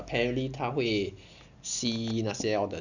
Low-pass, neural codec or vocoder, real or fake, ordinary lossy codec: 7.2 kHz; none; real; none